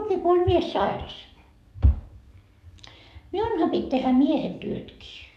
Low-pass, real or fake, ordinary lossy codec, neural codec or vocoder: 14.4 kHz; fake; none; codec, 44.1 kHz, 7.8 kbps, DAC